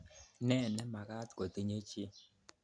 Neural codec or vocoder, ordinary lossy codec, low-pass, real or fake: none; none; 9.9 kHz; real